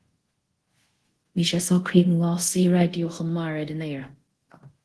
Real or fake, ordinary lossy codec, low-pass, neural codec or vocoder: fake; Opus, 16 kbps; 10.8 kHz; codec, 24 kHz, 0.5 kbps, DualCodec